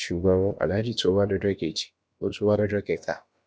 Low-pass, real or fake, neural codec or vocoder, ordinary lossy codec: none; fake; codec, 16 kHz, about 1 kbps, DyCAST, with the encoder's durations; none